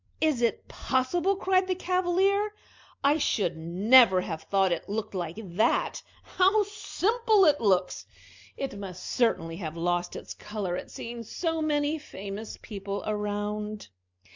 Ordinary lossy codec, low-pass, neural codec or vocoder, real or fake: MP3, 64 kbps; 7.2 kHz; none; real